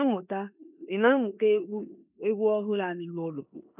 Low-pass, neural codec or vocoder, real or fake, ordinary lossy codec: 3.6 kHz; codec, 16 kHz in and 24 kHz out, 0.9 kbps, LongCat-Audio-Codec, four codebook decoder; fake; none